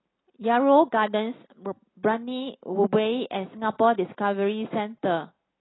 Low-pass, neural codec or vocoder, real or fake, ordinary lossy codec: 7.2 kHz; none; real; AAC, 16 kbps